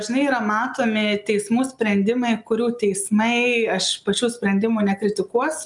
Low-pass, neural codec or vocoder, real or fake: 10.8 kHz; none; real